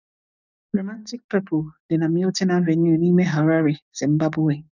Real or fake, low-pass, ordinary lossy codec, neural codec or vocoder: fake; 7.2 kHz; none; codec, 44.1 kHz, 7.8 kbps, DAC